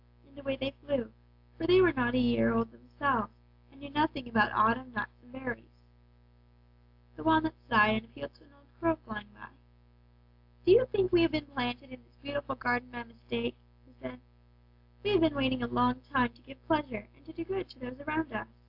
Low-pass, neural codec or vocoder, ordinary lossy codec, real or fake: 5.4 kHz; none; AAC, 48 kbps; real